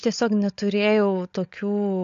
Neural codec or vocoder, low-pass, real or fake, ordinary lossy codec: codec, 16 kHz, 16 kbps, FunCodec, trained on LibriTTS, 50 frames a second; 7.2 kHz; fake; AAC, 64 kbps